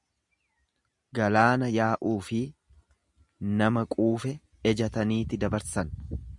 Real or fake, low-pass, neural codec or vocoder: real; 10.8 kHz; none